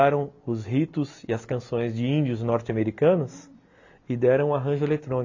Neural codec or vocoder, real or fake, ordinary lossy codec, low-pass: none; real; AAC, 48 kbps; 7.2 kHz